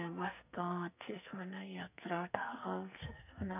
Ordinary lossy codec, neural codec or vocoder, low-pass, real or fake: none; codec, 44.1 kHz, 2.6 kbps, SNAC; 3.6 kHz; fake